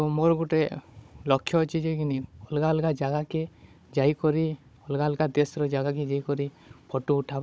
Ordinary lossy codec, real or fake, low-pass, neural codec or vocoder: none; fake; none; codec, 16 kHz, 16 kbps, FunCodec, trained on Chinese and English, 50 frames a second